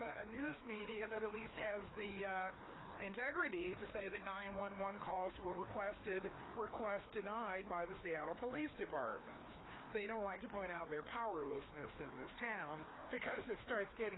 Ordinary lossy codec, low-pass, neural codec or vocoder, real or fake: AAC, 16 kbps; 7.2 kHz; codec, 16 kHz, 2 kbps, FreqCodec, larger model; fake